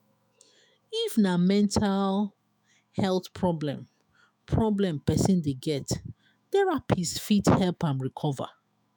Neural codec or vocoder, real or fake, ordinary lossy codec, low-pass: autoencoder, 48 kHz, 128 numbers a frame, DAC-VAE, trained on Japanese speech; fake; none; none